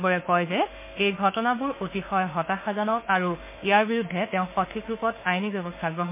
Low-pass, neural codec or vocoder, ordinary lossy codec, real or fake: 3.6 kHz; autoencoder, 48 kHz, 32 numbers a frame, DAC-VAE, trained on Japanese speech; MP3, 24 kbps; fake